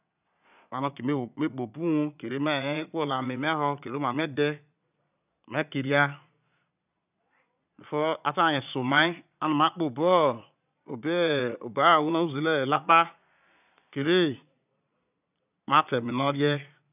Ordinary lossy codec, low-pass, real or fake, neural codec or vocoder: none; 3.6 kHz; fake; vocoder, 22.05 kHz, 80 mel bands, Vocos